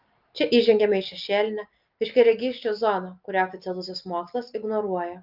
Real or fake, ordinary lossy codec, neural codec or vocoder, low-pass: real; Opus, 24 kbps; none; 5.4 kHz